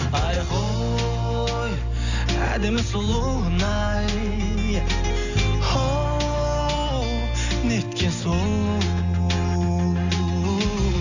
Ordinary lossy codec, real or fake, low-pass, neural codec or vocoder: none; real; 7.2 kHz; none